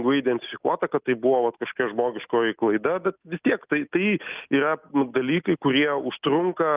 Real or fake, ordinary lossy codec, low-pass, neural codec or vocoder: real; Opus, 32 kbps; 3.6 kHz; none